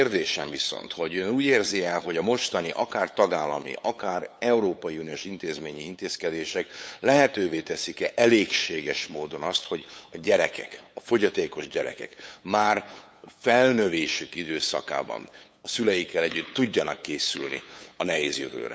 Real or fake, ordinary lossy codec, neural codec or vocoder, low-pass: fake; none; codec, 16 kHz, 8 kbps, FunCodec, trained on LibriTTS, 25 frames a second; none